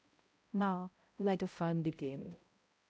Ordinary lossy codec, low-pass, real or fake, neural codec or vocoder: none; none; fake; codec, 16 kHz, 0.5 kbps, X-Codec, HuBERT features, trained on balanced general audio